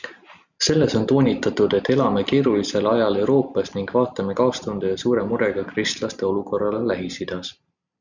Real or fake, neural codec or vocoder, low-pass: real; none; 7.2 kHz